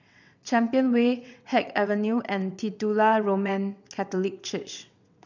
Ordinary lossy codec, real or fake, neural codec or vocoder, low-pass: none; fake; vocoder, 22.05 kHz, 80 mel bands, WaveNeXt; 7.2 kHz